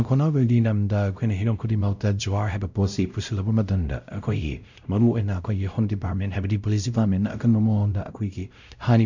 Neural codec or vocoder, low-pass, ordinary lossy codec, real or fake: codec, 16 kHz, 0.5 kbps, X-Codec, WavLM features, trained on Multilingual LibriSpeech; 7.2 kHz; none; fake